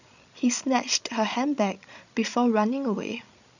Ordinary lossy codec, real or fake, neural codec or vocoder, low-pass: none; fake; codec, 16 kHz, 16 kbps, FreqCodec, larger model; 7.2 kHz